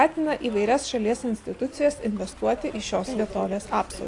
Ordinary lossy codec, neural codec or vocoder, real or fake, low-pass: AAC, 48 kbps; none; real; 10.8 kHz